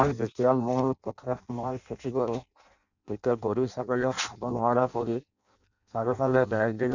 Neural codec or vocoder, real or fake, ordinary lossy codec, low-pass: codec, 16 kHz in and 24 kHz out, 0.6 kbps, FireRedTTS-2 codec; fake; none; 7.2 kHz